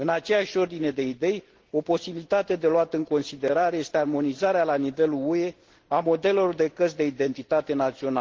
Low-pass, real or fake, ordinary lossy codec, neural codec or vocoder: 7.2 kHz; real; Opus, 16 kbps; none